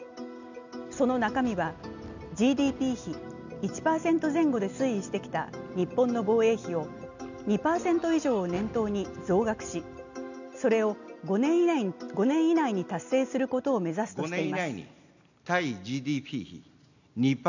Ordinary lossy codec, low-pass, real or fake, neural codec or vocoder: none; 7.2 kHz; real; none